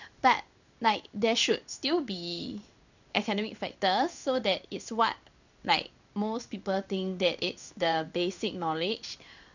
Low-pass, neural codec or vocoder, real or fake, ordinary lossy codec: 7.2 kHz; codec, 16 kHz in and 24 kHz out, 1 kbps, XY-Tokenizer; fake; none